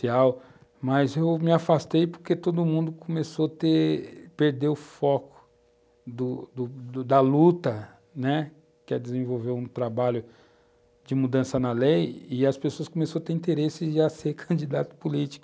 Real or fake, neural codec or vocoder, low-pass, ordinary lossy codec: real; none; none; none